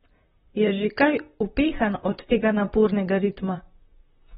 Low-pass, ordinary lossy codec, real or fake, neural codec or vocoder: 19.8 kHz; AAC, 16 kbps; fake; vocoder, 44.1 kHz, 128 mel bands, Pupu-Vocoder